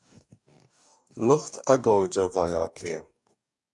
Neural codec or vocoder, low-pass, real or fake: codec, 44.1 kHz, 2.6 kbps, DAC; 10.8 kHz; fake